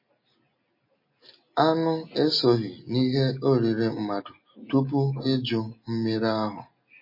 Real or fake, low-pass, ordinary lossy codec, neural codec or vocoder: real; 5.4 kHz; MP3, 24 kbps; none